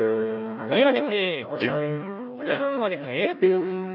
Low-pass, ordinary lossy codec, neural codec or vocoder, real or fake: 5.4 kHz; none; codec, 16 kHz, 1 kbps, FunCodec, trained on Chinese and English, 50 frames a second; fake